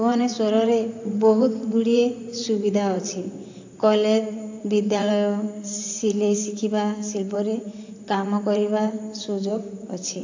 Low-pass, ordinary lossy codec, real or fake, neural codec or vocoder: 7.2 kHz; AAC, 48 kbps; fake; vocoder, 44.1 kHz, 128 mel bands, Pupu-Vocoder